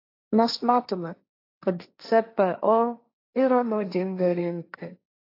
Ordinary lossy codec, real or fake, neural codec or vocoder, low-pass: AAC, 32 kbps; fake; codec, 16 kHz, 1.1 kbps, Voila-Tokenizer; 5.4 kHz